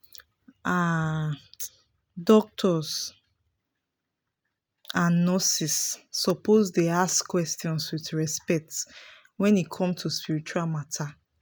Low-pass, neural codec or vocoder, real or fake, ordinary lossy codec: none; none; real; none